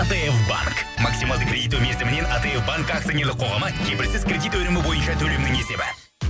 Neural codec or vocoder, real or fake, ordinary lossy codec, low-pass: none; real; none; none